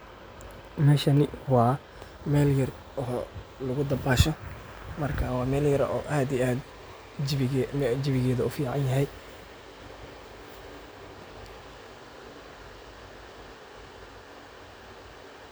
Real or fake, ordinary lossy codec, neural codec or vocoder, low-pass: real; none; none; none